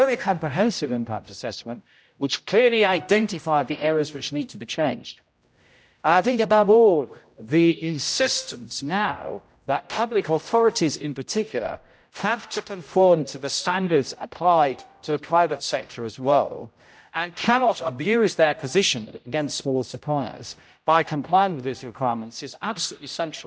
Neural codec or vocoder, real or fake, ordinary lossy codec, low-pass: codec, 16 kHz, 0.5 kbps, X-Codec, HuBERT features, trained on general audio; fake; none; none